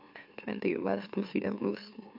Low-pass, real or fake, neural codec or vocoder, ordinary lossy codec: 5.4 kHz; fake; autoencoder, 44.1 kHz, a latent of 192 numbers a frame, MeloTTS; AAC, 48 kbps